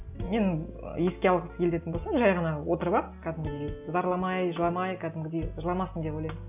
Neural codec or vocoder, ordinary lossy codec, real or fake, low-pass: none; none; real; 3.6 kHz